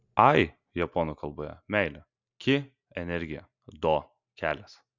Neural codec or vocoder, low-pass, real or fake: none; 7.2 kHz; real